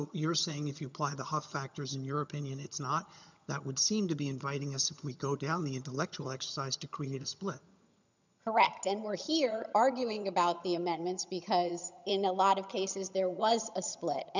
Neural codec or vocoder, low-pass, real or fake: vocoder, 22.05 kHz, 80 mel bands, HiFi-GAN; 7.2 kHz; fake